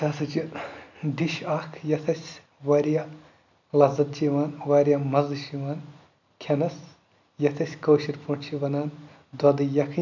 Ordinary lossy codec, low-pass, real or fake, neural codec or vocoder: none; 7.2 kHz; real; none